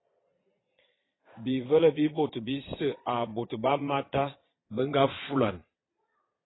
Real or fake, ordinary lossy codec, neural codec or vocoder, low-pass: fake; AAC, 16 kbps; vocoder, 22.05 kHz, 80 mel bands, Vocos; 7.2 kHz